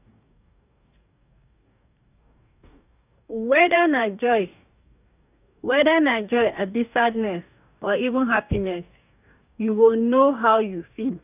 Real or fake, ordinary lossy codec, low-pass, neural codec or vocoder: fake; none; 3.6 kHz; codec, 44.1 kHz, 2.6 kbps, DAC